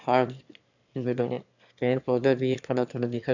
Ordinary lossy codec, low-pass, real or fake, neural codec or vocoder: none; 7.2 kHz; fake; autoencoder, 22.05 kHz, a latent of 192 numbers a frame, VITS, trained on one speaker